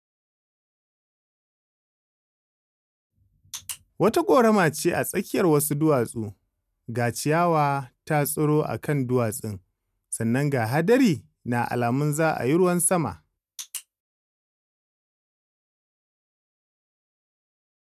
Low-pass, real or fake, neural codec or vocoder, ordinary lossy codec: 14.4 kHz; real; none; none